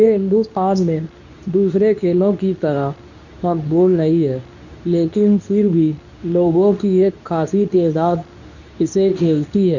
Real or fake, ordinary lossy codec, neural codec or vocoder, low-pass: fake; none; codec, 24 kHz, 0.9 kbps, WavTokenizer, medium speech release version 2; 7.2 kHz